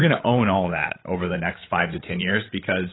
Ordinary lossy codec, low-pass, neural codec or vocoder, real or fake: AAC, 16 kbps; 7.2 kHz; codec, 16 kHz, 16 kbps, FreqCodec, larger model; fake